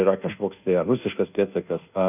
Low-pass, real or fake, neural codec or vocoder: 3.6 kHz; fake; codec, 24 kHz, 1.2 kbps, DualCodec